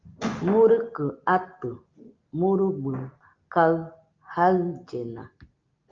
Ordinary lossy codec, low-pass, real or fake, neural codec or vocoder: Opus, 24 kbps; 7.2 kHz; real; none